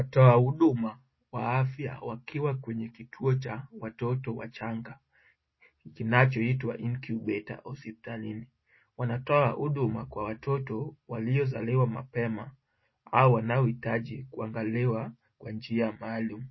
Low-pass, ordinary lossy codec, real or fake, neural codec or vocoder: 7.2 kHz; MP3, 24 kbps; real; none